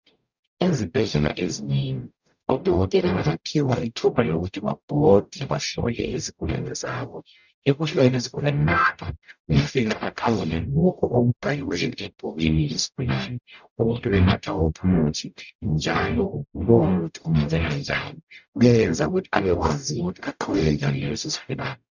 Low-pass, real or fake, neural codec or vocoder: 7.2 kHz; fake; codec, 44.1 kHz, 0.9 kbps, DAC